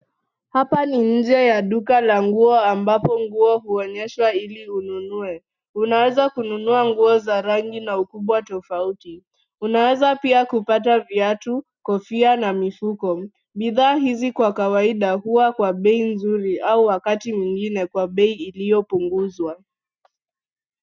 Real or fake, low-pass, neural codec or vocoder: real; 7.2 kHz; none